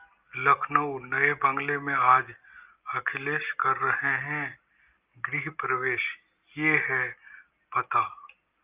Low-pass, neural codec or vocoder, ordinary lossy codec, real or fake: 3.6 kHz; none; Opus, 24 kbps; real